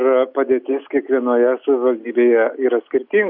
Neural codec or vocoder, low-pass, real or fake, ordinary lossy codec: none; 5.4 kHz; real; AAC, 48 kbps